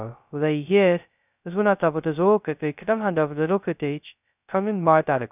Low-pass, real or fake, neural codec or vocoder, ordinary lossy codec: 3.6 kHz; fake; codec, 16 kHz, 0.2 kbps, FocalCodec; none